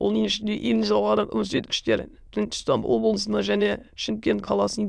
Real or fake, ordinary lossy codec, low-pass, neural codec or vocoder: fake; none; none; autoencoder, 22.05 kHz, a latent of 192 numbers a frame, VITS, trained on many speakers